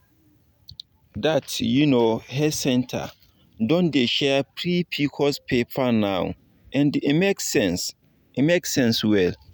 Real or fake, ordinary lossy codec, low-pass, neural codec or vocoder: real; none; none; none